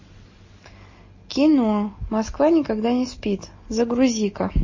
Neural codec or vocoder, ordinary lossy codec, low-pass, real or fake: none; MP3, 32 kbps; 7.2 kHz; real